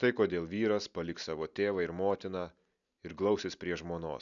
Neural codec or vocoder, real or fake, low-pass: none; real; 7.2 kHz